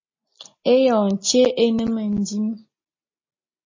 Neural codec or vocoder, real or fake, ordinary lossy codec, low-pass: none; real; MP3, 32 kbps; 7.2 kHz